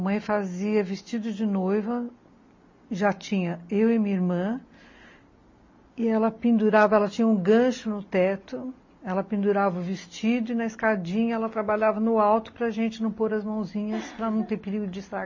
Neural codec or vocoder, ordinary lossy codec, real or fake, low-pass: none; MP3, 32 kbps; real; 7.2 kHz